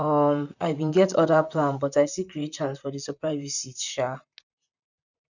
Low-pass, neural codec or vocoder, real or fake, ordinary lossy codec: 7.2 kHz; vocoder, 44.1 kHz, 128 mel bands, Pupu-Vocoder; fake; none